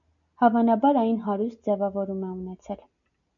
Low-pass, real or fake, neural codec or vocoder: 7.2 kHz; real; none